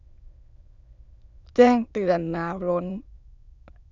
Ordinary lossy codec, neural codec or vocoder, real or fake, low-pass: none; autoencoder, 22.05 kHz, a latent of 192 numbers a frame, VITS, trained on many speakers; fake; 7.2 kHz